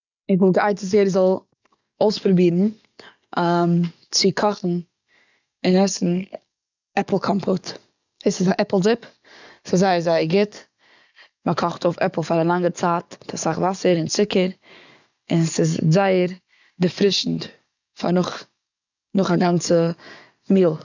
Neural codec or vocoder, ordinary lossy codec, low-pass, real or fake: codec, 44.1 kHz, 7.8 kbps, DAC; none; 7.2 kHz; fake